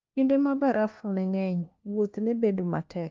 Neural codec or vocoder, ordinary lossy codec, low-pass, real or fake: codec, 16 kHz, 1 kbps, FunCodec, trained on LibriTTS, 50 frames a second; Opus, 24 kbps; 7.2 kHz; fake